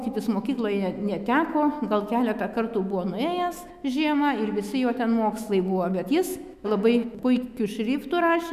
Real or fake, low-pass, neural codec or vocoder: fake; 14.4 kHz; autoencoder, 48 kHz, 128 numbers a frame, DAC-VAE, trained on Japanese speech